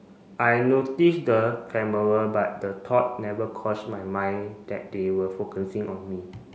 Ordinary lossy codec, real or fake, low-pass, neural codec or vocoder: none; real; none; none